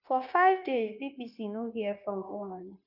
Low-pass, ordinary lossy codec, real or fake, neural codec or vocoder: 5.4 kHz; none; fake; codec, 16 kHz, 0.9 kbps, LongCat-Audio-Codec